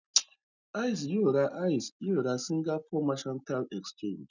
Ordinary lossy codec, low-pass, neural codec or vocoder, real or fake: none; 7.2 kHz; none; real